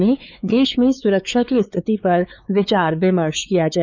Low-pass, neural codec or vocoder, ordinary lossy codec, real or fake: none; codec, 16 kHz, 4 kbps, X-Codec, WavLM features, trained on Multilingual LibriSpeech; none; fake